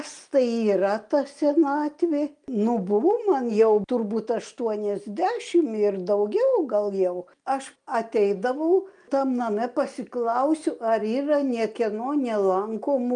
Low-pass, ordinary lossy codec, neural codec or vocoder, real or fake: 9.9 kHz; Opus, 32 kbps; none; real